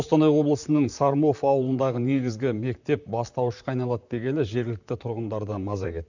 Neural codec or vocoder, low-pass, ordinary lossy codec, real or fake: vocoder, 44.1 kHz, 128 mel bands, Pupu-Vocoder; 7.2 kHz; none; fake